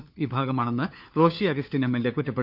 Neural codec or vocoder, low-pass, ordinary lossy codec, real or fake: codec, 16 kHz, 4 kbps, FunCodec, trained on LibriTTS, 50 frames a second; 5.4 kHz; none; fake